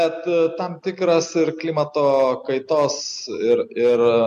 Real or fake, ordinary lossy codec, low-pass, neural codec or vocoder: real; MP3, 64 kbps; 14.4 kHz; none